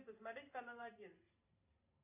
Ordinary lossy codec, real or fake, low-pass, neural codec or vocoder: AAC, 32 kbps; fake; 3.6 kHz; vocoder, 44.1 kHz, 128 mel bands every 512 samples, BigVGAN v2